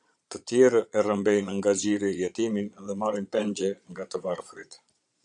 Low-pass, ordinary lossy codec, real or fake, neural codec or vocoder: 9.9 kHz; MP3, 96 kbps; fake; vocoder, 22.05 kHz, 80 mel bands, Vocos